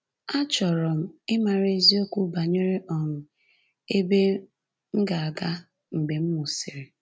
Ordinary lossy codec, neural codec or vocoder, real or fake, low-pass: none; none; real; none